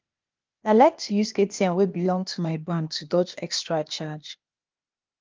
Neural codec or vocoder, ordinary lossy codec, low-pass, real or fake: codec, 16 kHz, 0.8 kbps, ZipCodec; Opus, 32 kbps; 7.2 kHz; fake